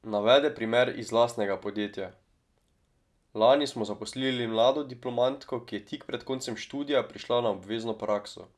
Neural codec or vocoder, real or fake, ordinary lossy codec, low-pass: none; real; none; none